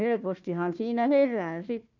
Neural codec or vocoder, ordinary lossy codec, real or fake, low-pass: codec, 16 kHz, 1 kbps, FunCodec, trained on Chinese and English, 50 frames a second; none; fake; 7.2 kHz